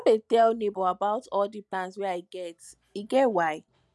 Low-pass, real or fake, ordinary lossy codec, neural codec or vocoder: none; real; none; none